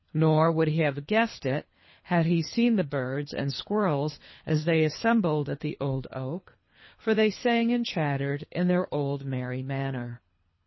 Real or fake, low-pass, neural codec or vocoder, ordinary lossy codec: fake; 7.2 kHz; codec, 24 kHz, 3 kbps, HILCodec; MP3, 24 kbps